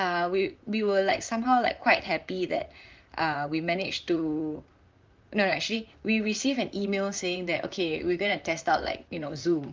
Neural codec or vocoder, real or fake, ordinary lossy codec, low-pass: vocoder, 44.1 kHz, 128 mel bands, Pupu-Vocoder; fake; Opus, 32 kbps; 7.2 kHz